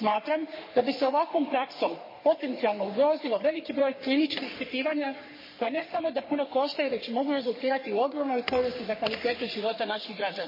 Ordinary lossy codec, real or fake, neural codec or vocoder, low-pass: MP3, 24 kbps; fake; codec, 44.1 kHz, 3.4 kbps, Pupu-Codec; 5.4 kHz